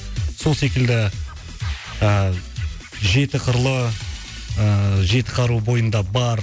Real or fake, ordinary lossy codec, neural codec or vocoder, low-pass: real; none; none; none